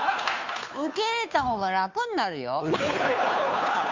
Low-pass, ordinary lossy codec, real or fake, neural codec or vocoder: 7.2 kHz; MP3, 64 kbps; fake; codec, 16 kHz, 2 kbps, FunCodec, trained on Chinese and English, 25 frames a second